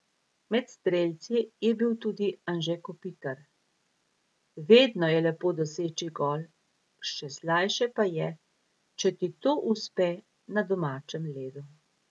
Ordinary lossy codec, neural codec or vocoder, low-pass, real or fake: none; none; none; real